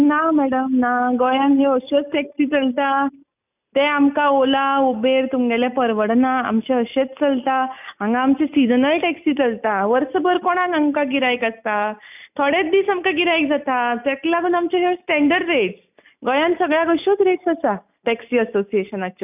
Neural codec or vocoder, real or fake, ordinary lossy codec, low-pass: none; real; none; 3.6 kHz